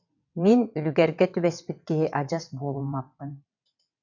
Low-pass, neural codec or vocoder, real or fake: 7.2 kHz; vocoder, 22.05 kHz, 80 mel bands, WaveNeXt; fake